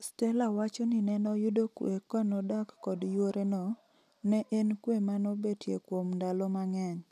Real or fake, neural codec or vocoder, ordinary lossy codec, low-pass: real; none; none; 14.4 kHz